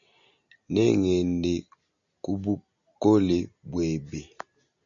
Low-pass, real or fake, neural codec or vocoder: 7.2 kHz; real; none